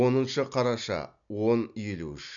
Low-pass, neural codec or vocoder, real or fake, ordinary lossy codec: 7.2 kHz; none; real; none